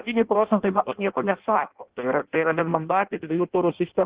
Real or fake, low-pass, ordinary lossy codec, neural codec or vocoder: fake; 3.6 kHz; Opus, 16 kbps; codec, 16 kHz in and 24 kHz out, 0.6 kbps, FireRedTTS-2 codec